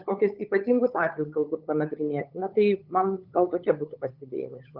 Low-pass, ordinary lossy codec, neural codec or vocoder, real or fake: 5.4 kHz; Opus, 32 kbps; codec, 16 kHz, 8 kbps, FunCodec, trained on LibriTTS, 25 frames a second; fake